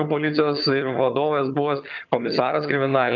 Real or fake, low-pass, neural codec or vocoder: fake; 7.2 kHz; vocoder, 22.05 kHz, 80 mel bands, HiFi-GAN